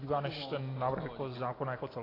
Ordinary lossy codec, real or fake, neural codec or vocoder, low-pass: AAC, 24 kbps; real; none; 5.4 kHz